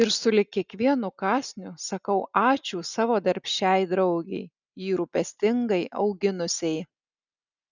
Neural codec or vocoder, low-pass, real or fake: none; 7.2 kHz; real